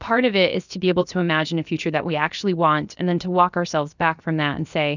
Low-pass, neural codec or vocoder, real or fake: 7.2 kHz; codec, 16 kHz, about 1 kbps, DyCAST, with the encoder's durations; fake